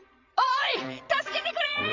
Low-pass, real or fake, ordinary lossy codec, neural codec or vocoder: 7.2 kHz; fake; MP3, 48 kbps; vocoder, 22.05 kHz, 80 mel bands, Vocos